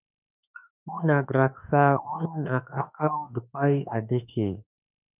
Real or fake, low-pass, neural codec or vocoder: fake; 3.6 kHz; autoencoder, 48 kHz, 32 numbers a frame, DAC-VAE, trained on Japanese speech